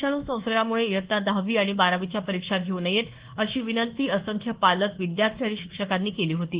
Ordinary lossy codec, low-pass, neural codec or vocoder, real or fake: Opus, 16 kbps; 3.6 kHz; codec, 24 kHz, 1.2 kbps, DualCodec; fake